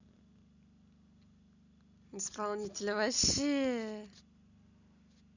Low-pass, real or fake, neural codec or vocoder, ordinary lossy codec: 7.2 kHz; real; none; none